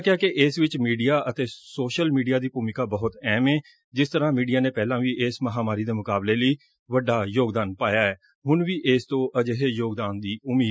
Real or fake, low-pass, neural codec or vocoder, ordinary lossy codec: real; none; none; none